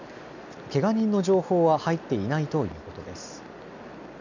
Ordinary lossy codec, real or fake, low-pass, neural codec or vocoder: none; real; 7.2 kHz; none